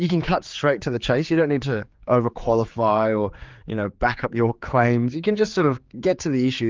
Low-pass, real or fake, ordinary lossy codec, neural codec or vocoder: 7.2 kHz; fake; Opus, 32 kbps; codec, 16 kHz, 4 kbps, X-Codec, HuBERT features, trained on general audio